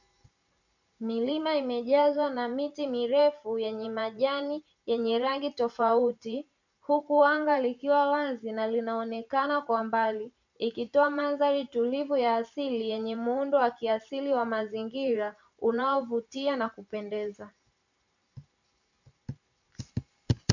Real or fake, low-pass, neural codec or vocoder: fake; 7.2 kHz; vocoder, 44.1 kHz, 128 mel bands every 512 samples, BigVGAN v2